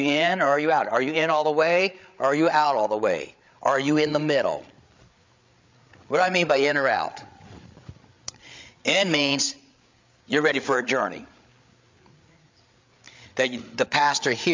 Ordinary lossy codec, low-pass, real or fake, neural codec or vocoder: MP3, 64 kbps; 7.2 kHz; fake; codec, 16 kHz, 8 kbps, FreqCodec, larger model